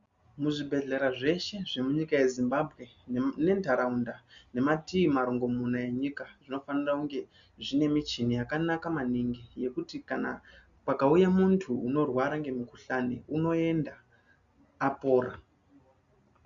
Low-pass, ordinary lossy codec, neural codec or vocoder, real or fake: 7.2 kHz; Opus, 64 kbps; none; real